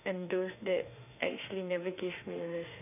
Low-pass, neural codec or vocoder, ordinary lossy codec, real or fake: 3.6 kHz; autoencoder, 48 kHz, 32 numbers a frame, DAC-VAE, trained on Japanese speech; none; fake